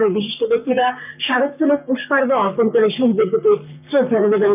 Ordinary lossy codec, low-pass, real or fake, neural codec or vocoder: none; 3.6 kHz; fake; codec, 44.1 kHz, 3.4 kbps, Pupu-Codec